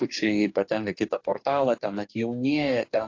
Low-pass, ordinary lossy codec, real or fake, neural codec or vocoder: 7.2 kHz; AAC, 48 kbps; fake; codec, 44.1 kHz, 2.6 kbps, DAC